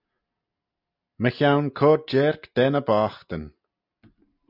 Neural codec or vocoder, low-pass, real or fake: none; 5.4 kHz; real